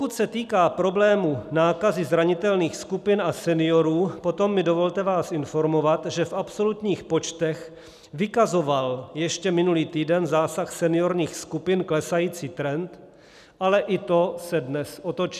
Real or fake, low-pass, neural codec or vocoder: real; 14.4 kHz; none